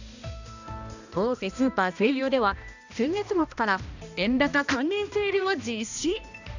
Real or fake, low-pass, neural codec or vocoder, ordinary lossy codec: fake; 7.2 kHz; codec, 16 kHz, 1 kbps, X-Codec, HuBERT features, trained on balanced general audio; none